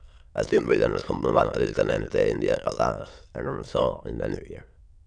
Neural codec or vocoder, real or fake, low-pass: autoencoder, 22.05 kHz, a latent of 192 numbers a frame, VITS, trained on many speakers; fake; 9.9 kHz